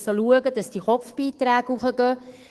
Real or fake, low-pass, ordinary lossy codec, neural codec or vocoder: fake; 10.8 kHz; Opus, 16 kbps; codec, 24 kHz, 3.1 kbps, DualCodec